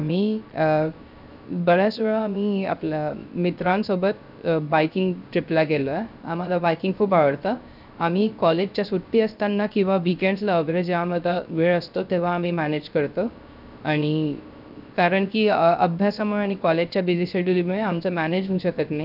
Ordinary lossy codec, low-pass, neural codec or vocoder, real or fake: none; 5.4 kHz; codec, 16 kHz, 0.3 kbps, FocalCodec; fake